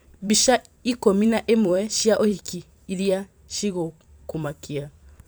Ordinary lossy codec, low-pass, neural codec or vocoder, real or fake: none; none; vocoder, 44.1 kHz, 128 mel bands every 512 samples, BigVGAN v2; fake